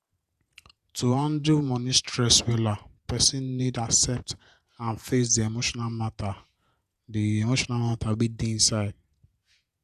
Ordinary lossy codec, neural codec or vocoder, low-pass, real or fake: none; vocoder, 48 kHz, 128 mel bands, Vocos; 14.4 kHz; fake